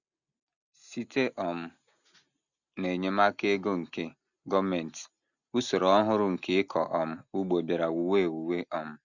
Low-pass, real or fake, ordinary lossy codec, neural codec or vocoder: 7.2 kHz; real; none; none